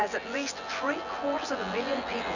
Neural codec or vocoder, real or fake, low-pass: vocoder, 24 kHz, 100 mel bands, Vocos; fake; 7.2 kHz